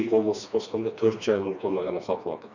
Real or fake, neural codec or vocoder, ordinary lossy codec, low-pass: fake; codec, 16 kHz, 2 kbps, FreqCodec, smaller model; none; 7.2 kHz